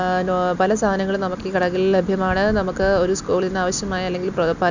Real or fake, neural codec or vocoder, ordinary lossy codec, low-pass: real; none; none; 7.2 kHz